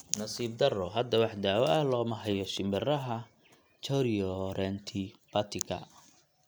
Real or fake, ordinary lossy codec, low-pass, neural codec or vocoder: real; none; none; none